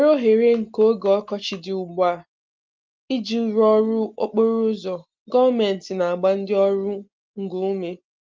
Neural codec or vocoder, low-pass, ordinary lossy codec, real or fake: none; 7.2 kHz; Opus, 32 kbps; real